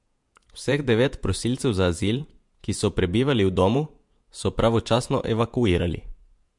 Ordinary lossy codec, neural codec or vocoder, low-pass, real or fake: MP3, 64 kbps; vocoder, 48 kHz, 128 mel bands, Vocos; 10.8 kHz; fake